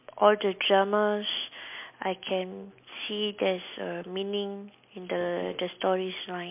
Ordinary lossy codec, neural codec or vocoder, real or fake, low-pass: MP3, 32 kbps; none; real; 3.6 kHz